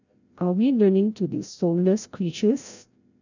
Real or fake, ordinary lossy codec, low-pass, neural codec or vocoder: fake; none; 7.2 kHz; codec, 16 kHz, 0.5 kbps, FreqCodec, larger model